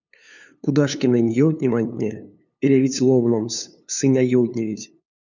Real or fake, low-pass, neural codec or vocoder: fake; 7.2 kHz; codec, 16 kHz, 2 kbps, FunCodec, trained on LibriTTS, 25 frames a second